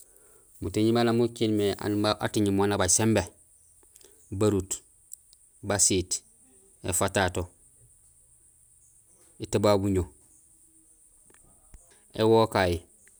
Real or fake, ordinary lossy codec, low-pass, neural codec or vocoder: real; none; none; none